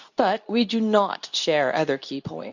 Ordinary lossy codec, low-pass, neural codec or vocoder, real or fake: none; 7.2 kHz; codec, 24 kHz, 0.9 kbps, WavTokenizer, medium speech release version 2; fake